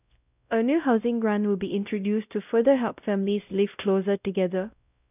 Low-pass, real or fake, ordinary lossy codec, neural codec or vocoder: 3.6 kHz; fake; none; codec, 16 kHz, 0.5 kbps, X-Codec, WavLM features, trained on Multilingual LibriSpeech